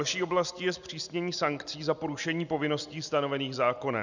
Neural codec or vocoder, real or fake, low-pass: none; real; 7.2 kHz